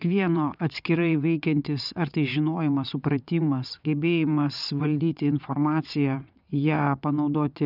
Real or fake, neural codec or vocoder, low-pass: fake; vocoder, 22.05 kHz, 80 mel bands, WaveNeXt; 5.4 kHz